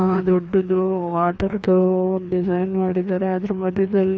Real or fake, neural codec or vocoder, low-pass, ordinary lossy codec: fake; codec, 16 kHz, 2 kbps, FreqCodec, larger model; none; none